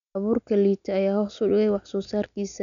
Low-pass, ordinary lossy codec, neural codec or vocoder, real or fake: 7.2 kHz; none; none; real